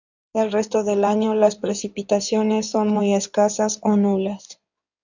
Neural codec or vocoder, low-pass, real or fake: codec, 16 kHz in and 24 kHz out, 2.2 kbps, FireRedTTS-2 codec; 7.2 kHz; fake